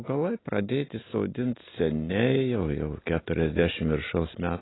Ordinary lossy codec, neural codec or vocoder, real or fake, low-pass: AAC, 16 kbps; none; real; 7.2 kHz